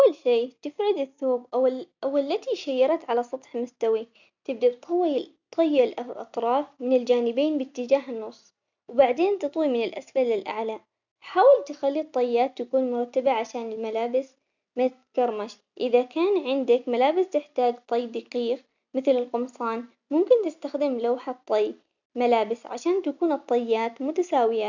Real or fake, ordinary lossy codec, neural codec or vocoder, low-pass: real; none; none; 7.2 kHz